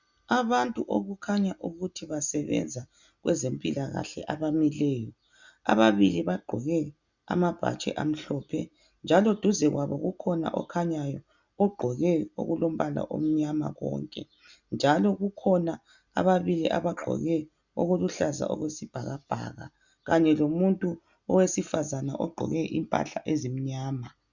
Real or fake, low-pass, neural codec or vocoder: real; 7.2 kHz; none